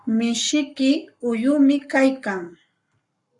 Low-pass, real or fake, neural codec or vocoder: 10.8 kHz; fake; codec, 44.1 kHz, 7.8 kbps, Pupu-Codec